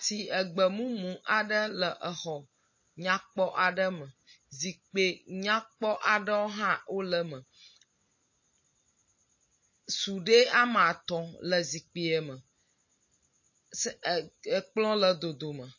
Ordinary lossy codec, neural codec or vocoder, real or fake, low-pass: MP3, 32 kbps; none; real; 7.2 kHz